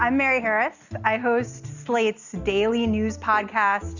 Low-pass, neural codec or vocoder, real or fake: 7.2 kHz; none; real